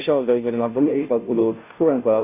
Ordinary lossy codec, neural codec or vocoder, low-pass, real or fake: none; codec, 16 kHz, 0.5 kbps, FunCodec, trained on Chinese and English, 25 frames a second; 3.6 kHz; fake